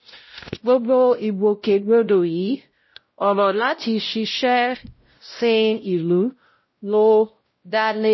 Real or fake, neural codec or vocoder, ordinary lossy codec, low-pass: fake; codec, 16 kHz, 0.5 kbps, X-Codec, WavLM features, trained on Multilingual LibriSpeech; MP3, 24 kbps; 7.2 kHz